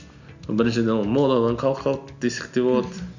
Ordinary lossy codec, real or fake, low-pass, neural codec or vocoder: none; real; 7.2 kHz; none